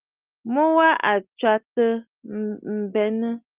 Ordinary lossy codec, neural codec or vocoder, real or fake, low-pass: Opus, 24 kbps; none; real; 3.6 kHz